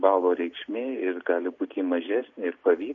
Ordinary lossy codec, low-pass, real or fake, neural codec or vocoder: MP3, 48 kbps; 7.2 kHz; real; none